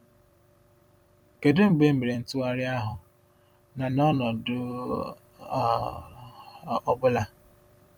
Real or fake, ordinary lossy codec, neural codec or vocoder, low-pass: fake; none; vocoder, 44.1 kHz, 128 mel bands every 256 samples, BigVGAN v2; 19.8 kHz